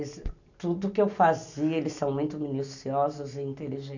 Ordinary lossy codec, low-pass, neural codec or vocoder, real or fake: none; 7.2 kHz; none; real